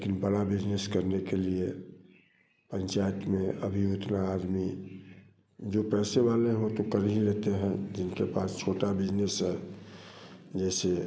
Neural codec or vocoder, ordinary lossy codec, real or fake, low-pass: none; none; real; none